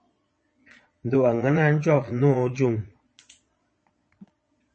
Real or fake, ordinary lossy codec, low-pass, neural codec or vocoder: fake; MP3, 32 kbps; 9.9 kHz; vocoder, 22.05 kHz, 80 mel bands, Vocos